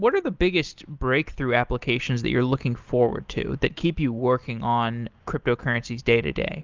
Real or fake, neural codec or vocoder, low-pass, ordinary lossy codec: real; none; 7.2 kHz; Opus, 24 kbps